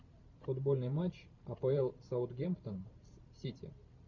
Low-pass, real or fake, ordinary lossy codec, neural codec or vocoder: 7.2 kHz; fake; MP3, 64 kbps; vocoder, 44.1 kHz, 128 mel bands every 512 samples, BigVGAN v2